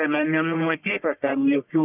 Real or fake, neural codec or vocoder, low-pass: fake; codec, 44.1 kHz, 1.7 kbps, Pupu-Codec; 3.6 kHz